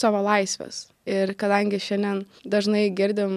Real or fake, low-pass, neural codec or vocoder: real; 14.4 kHz; none